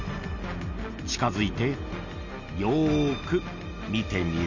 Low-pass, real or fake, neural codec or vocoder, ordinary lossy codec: 7.2 kHz; real; none; none